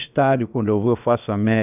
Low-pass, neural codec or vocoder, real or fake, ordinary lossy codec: 3.6 kHz; codec, 16 kHz, about 1 kbps, DyCAST, with the encoder's durations; fake; none